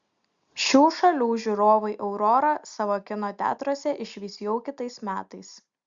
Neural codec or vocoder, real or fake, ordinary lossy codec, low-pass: none; real; Opus, 64 kbps; 7.2 kHz